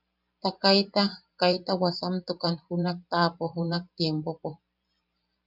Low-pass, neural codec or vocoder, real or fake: 5.4 kHz; vocoder, 44.1 kHz, 128 mel bands every 512 samples, BigVGAN v2; fake